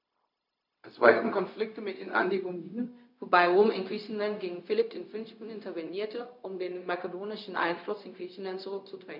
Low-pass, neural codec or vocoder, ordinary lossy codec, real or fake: 5.4 kHz; codec, 16 kHz, 0.4 kbps, LongCat-Audio-Codec; none; fake